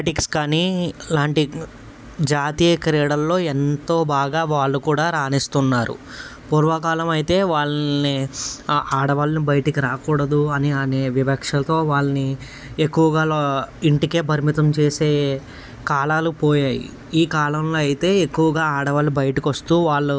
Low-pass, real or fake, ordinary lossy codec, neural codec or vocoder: none; real; none; none